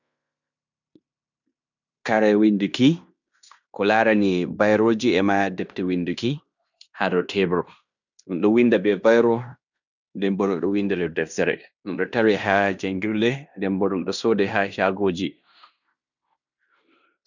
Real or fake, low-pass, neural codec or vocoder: fake; 7.2 kHz; codec, 16 kHz in and 24 kHz out, 0.9 kbps, LongCat-Audio-Codec, fine tuned four codebook decoder